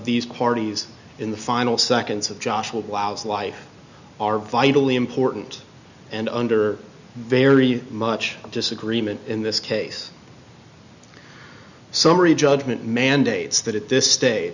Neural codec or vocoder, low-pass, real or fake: none; 7.2 kHz; real